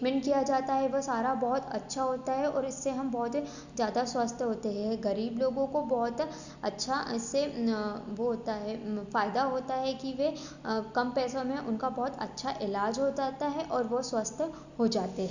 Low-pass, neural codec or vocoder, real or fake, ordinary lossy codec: 7.2 kHz; none; real; none